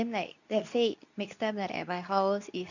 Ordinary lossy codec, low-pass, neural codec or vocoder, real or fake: none; 7.2 kHz; codec, 24 kHz, 0.9 kbps, WavTokenizer, medium speech release version 2; fake